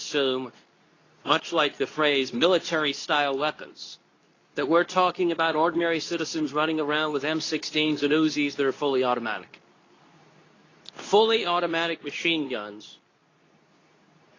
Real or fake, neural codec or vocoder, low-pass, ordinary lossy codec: fake; codec, 24 kHz, 0.9 kbps, WavTokenizer, medium speech release version 2; 7.2 kHz; AAC, 32 kbps